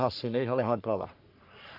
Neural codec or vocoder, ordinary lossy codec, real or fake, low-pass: codec, 44.1 kHz, 3.4 kbps, Pupu-Codec; MP3, 48 kbps; fake; 5.4 kHz